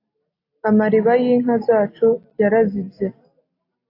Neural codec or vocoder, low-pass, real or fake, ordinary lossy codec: none; 5.4 kHz; real; AAC, 32 kbps